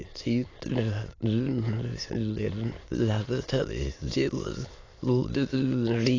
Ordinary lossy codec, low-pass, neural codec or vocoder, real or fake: AAC, 32 kbps; 7.2 kHz; autoencoder, 22.05 kHz, a latent of 192 numbers a frame, VITS, trained on many speakers; fake